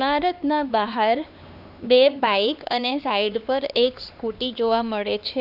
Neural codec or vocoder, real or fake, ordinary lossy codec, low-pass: codec, 16 kHz, 2 kbps, X-Codec, HuBERT features, trained on LibriSpeech; fake; none; 5.4 kHz